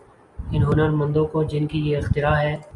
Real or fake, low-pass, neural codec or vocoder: real; 10.8 kHz; none